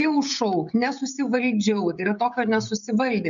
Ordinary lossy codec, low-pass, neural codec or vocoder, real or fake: MP3, 96 kbps; 7.2 kHz; codec, 16 kHz, 16 kbps, FreqCodec, smaller model; fake